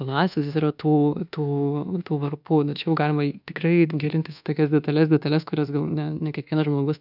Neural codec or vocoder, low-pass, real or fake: codec, 24 kHz, 1.2 kbps, DualCodec; 5.4 kHz; fake